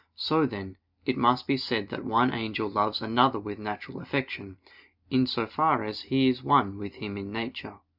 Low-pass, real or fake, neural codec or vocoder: 5.4 kHz; real; none